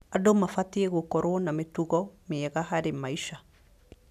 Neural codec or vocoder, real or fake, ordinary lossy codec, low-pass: none; real; none; 14.4 kHz